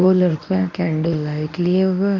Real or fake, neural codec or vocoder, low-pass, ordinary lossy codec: fake; codec, 24 kHz, 0.9 kbps, WavTokenizer, medium speech release version 1; 7.2 kHz; none